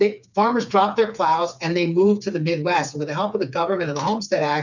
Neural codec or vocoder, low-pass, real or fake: codec, 16 kHz, 4 kbps, FreqCodec, smaller model; 7.2 kHz; fake